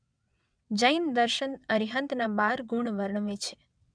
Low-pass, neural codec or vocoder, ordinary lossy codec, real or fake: 9.9 kHz; vocoder, 22.05 kHz, 80 mel bands, WaveNeXt; none; fake